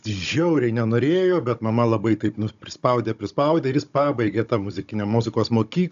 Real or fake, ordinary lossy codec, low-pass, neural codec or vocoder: fake; AAC, 96 kbps; 7.2 kHz; codec, 16 kHz, 16 kbps, FunCodec, trained on Chinese and English, 50 frames a second